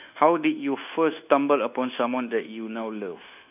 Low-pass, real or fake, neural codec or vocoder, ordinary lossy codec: 3.6 kHz; fake; codec, 24 kHz, 1.2 kbps, DualCodec; none